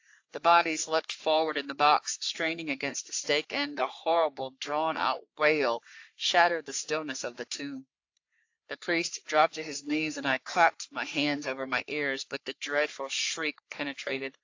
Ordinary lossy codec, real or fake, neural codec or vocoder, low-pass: AAC, 48 kbps; fake; codec, 44.1 kHz, 3.4 kbps, Pupu-Codec; 7.2 kHz